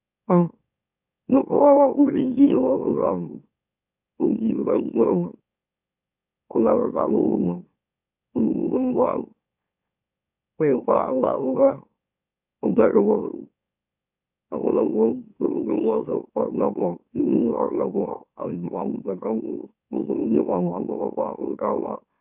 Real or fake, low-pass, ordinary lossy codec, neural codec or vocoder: fake; 3.6 kHz; none; autoencoder, 44.1 kHz, a latent of 192 numbers a frame, MeloTTS